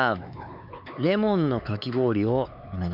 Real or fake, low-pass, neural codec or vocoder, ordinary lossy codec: fake; 5.4 kHz; codec, 16 kHz, 4 kbps, X-Codec, HuBERT features, trained on LibriSpeech; none